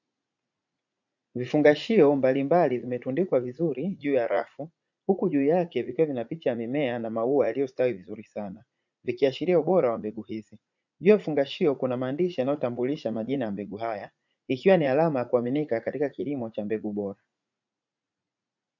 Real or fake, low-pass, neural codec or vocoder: fake; 7.2 kHz; vocoder, 44.1 kHz, 80 mel bands, Vocos